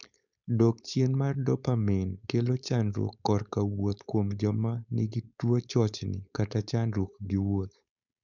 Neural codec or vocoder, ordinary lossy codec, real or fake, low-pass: codec, 16 kHz, 4.8 kbps, FACodec; none; fake; 7.2 kHz